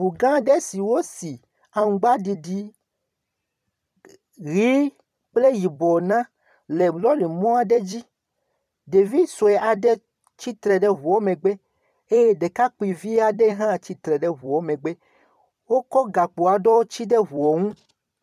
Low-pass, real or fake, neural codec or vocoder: 14.4 kHz; fake; vocoder, 44.1 kHz, 128 mel bands every 256 samples, BigVGAN v2